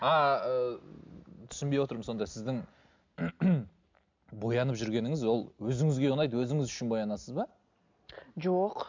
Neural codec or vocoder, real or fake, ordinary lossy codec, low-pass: none; real; MP3, 64 kbps; 7.2 kHz